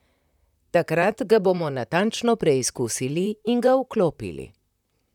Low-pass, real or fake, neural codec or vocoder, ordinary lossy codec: 19.8 kHz; fake; vocoder, 44.1 kHz, 128 mel bands, Pupu-Vocoder; none